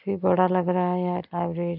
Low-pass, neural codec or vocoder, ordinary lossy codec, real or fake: 5.4 kHz; none; none; real